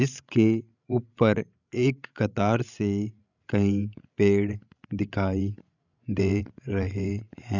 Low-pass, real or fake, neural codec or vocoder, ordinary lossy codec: 7.2 kHz; fake; codec, 16 kHz, 16 kbps, FreqCodec, larger model; none